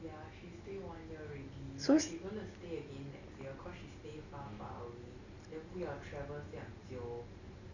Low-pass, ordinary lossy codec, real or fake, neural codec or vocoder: 7.2 kHz; none; real; none